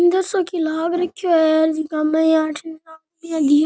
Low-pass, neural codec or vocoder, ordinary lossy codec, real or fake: none; none; none; real